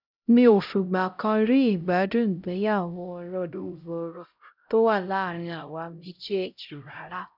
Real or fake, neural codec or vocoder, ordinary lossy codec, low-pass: fake; codec, 16 kHz, 0.5 kbps, X-Codec, HuBERT features, trained on LibriSpeech; none; 5.4 kHz